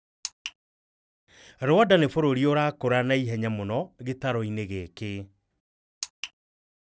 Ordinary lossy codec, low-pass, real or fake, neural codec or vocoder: none; none; real; none